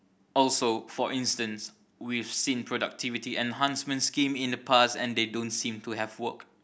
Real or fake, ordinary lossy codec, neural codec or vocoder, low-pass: real; none; none; none